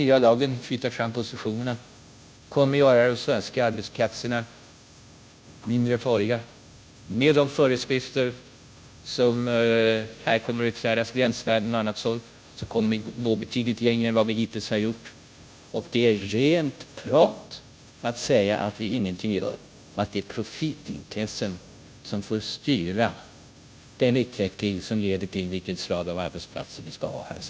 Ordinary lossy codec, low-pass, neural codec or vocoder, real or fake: none; none; codec, 16 kHz, 0.5 kbps, FunCodec, trained on Chinese and English, 25 frames a second; fake